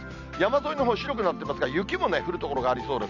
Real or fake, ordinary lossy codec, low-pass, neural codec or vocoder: real; none; 7.2 kHz; none